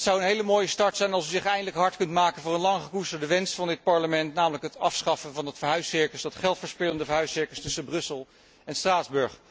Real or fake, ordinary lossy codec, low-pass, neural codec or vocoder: real; none; none; none